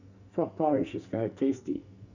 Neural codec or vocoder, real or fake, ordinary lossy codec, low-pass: codec, 44.1 kHz, 2.6 kbps, SNAC; fake; none; 7.2 kHz